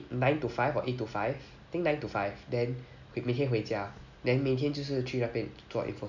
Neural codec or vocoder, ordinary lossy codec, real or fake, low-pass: none; none; real; 7.2 kHz